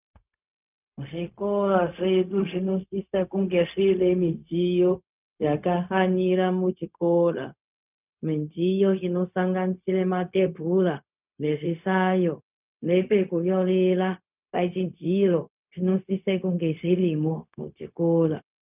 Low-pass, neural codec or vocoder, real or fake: 3.6 kHz; codec, 16 kHz, 0.4 kbps, LongCat-Audio-Codec; fake